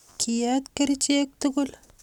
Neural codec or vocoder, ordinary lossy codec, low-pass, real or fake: none; none; 19.8 kHz; real